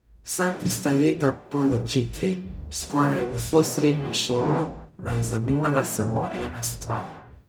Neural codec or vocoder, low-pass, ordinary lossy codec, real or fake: codec, 44.1 kHz, 0.9 kbps, DAC; none; none; fake